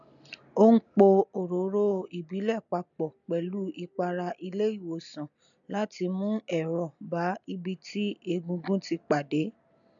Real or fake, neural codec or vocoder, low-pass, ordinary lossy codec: real; none; 7.2 kHz; none